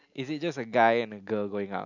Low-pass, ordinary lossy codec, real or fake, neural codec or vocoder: 7.2 kHz; none; real; none